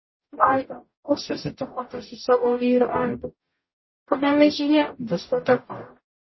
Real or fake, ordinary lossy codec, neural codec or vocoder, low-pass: fake; MP3, 24 kbps; codec, 44.1 kHz, 0.9 kbps, DAC; 7.2 kHz